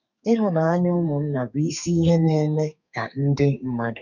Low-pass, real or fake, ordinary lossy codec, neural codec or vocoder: 7.2 kHz; fake; none; codec, 32 kHz, 1.9 kbps, SNAC